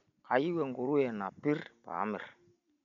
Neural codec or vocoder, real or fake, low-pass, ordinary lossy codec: none; real; 7.2 kHz; none